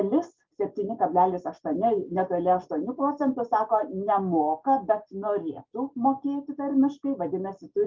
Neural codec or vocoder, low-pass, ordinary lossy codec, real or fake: none; 7.2 kHz; Opus, 32 kbps; real